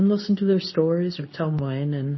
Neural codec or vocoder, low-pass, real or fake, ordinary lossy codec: codec, 24 kHz, 0.9 kbps, WavTokenizer, medium speech release version 2; 7.2 kHz; fake; MP3, 24 kbps